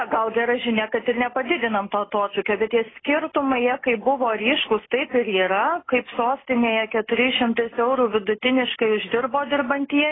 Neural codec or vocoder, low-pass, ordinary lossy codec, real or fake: none; 7.2 kHz; AAC, 16 kbps; real